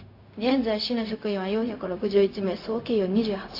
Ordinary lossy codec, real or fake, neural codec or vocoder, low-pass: AAC, 32 kbps; fake; codec, 16 kHz, 0.4 kbps, LongCat-Audio-Codec; 5.4 kHz